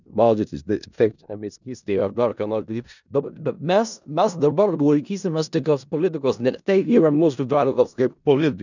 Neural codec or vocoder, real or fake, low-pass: codec, 16 kHz in and 24 kHz out, 0.4 kbps, LongCat-Audio-Codec, four codebook decoder; fake; 7.2 kHz